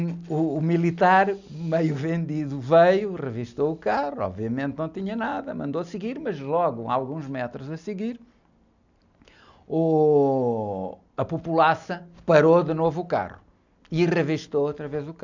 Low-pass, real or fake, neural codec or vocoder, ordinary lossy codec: 7.2 kHz; real; none; none